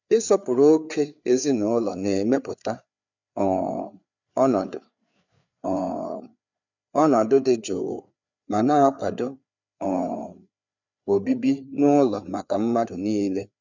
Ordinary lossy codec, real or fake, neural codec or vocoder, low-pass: none; fake; codec, 16 kHz, 4 kbps, FreqCodec, larger model; 7.2 kHz